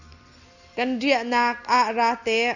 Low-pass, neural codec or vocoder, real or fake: 7.2 kHz; none; real